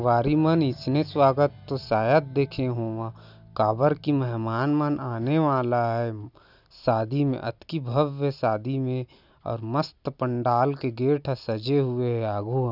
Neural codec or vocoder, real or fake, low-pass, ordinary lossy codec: none; real; 5.4 kHz; none